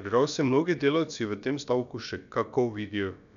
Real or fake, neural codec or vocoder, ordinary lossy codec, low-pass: fake; codec, 16 kHz, about 1 kbps, DyCAST, with the encoder's durations; none; 7.2 kHz